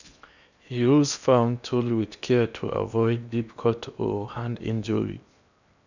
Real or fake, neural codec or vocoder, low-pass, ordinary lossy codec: fake; codec, 16 kHz in and 24 kHz out, 0.8 kbps, FocalCodec, streaming, 65536 codes; 7.2 kHz; none